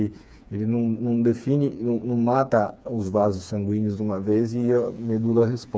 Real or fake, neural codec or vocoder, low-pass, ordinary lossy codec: fake; codec, 16 kHz, 4 kbps, FreqCodec, smaller model; none; none